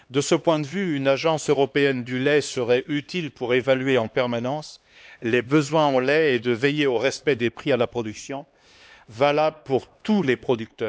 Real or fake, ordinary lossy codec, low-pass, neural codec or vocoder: fake; none; none; codec, 16 kHz, 2 kbps, X-Codec, HuBERT features, trained on LibriSpeech